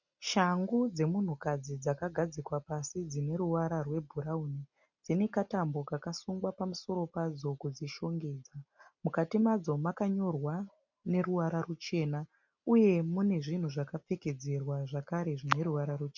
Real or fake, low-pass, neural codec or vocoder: real; 7.2 kHz; none